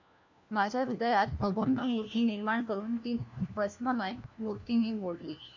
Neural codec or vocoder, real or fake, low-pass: codec, 16 kHz, 1 kbps, FunCodec, trained on LibriTTS, 50 frames a second; fake; 7.2 kHz